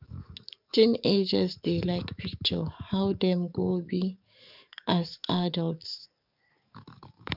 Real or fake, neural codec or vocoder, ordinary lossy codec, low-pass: fake; codec, 44.1 kHz, 7.8 kbps, DAC; none; 5.4 kHz